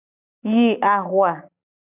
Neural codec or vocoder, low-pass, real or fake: none; 3.6 kHz; real